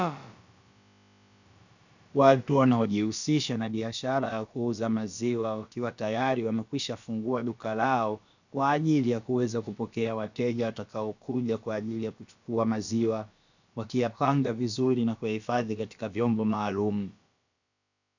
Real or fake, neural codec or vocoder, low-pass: fake; codec, 16 kHz, about 1 kbps, DyCAST, with the encoder's durations; 7.2 kHz